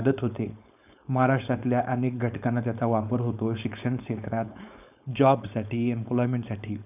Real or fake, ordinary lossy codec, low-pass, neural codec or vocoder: fake; none; 3.6 kHz; codec, 16 kHz, 4.8 kbps, FACodec